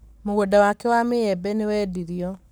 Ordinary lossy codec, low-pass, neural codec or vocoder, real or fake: none; none; codec, 44.1 kHz, 7.8 kbps, Pupu-Codec; fake